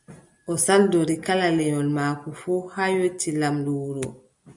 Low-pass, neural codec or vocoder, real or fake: 10.8 kHz; none; real